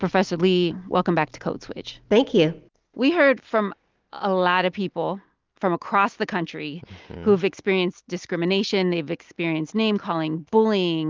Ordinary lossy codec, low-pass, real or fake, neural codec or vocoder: Opus, 24 kbps; 7.2 kHz; real; none